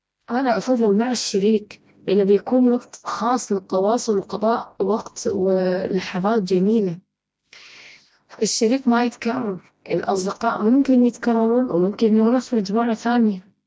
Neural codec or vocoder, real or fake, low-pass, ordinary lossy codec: codec, 16 kHz, 1 kbps, FreqCodec, smaller model; fake; none; none